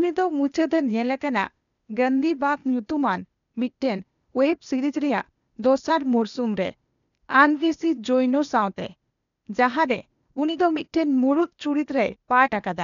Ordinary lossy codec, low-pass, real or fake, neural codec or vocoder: none; 7.2 kHz; fake; codec, 16 kHz, 0.8 kbps, ZipCodec